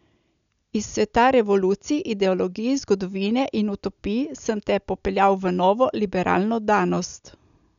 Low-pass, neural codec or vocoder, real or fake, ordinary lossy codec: 7.2 kHz; none; real; none